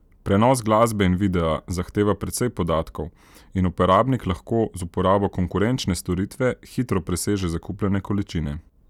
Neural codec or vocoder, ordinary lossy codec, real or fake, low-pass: none; none; real; 19.8 kHz